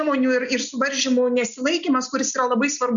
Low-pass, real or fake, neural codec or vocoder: 7.2 kHz; real; none